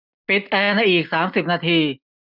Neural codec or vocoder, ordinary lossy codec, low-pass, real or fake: none; none; 5.4 kHz; real